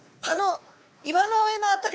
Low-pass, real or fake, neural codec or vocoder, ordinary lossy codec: none; fake; codec, 16 kHz, 2 kbps, X-Codec, WavLM features, trained on Multilingual LibriSpeech; none